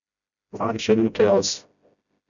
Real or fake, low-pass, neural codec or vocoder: fake; 7.2 kHz; codec, 16 kHz, 0.5 kbps, FreqCodec, smaller model